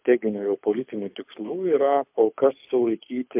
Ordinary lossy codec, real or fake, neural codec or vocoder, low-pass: MP3, 32 kbps; fake; codec, 24 kHz, 6 kbps, HILCodec; 3.6 kHz